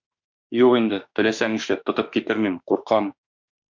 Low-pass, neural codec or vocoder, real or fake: 7.2 kHz; autoencoder, 48 kHz, 32 numbers a frame, DAC-VAE, trained on Japanese speech; fake